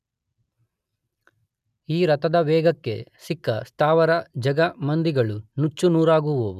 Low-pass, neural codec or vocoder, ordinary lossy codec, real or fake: 14.4 kHz; none; none; real